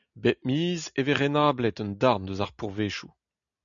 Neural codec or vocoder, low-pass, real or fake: none; 7.2 kHz; real